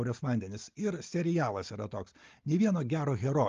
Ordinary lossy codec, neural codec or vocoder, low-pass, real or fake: Opus, 32 kbps; none; 7.2 kHz; real